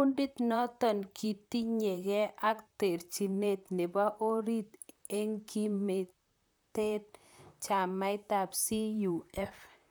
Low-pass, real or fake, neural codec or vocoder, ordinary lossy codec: none; fake; vocoder, 44.1 kHz, 128 mel bands, Pupu-Vocoder; none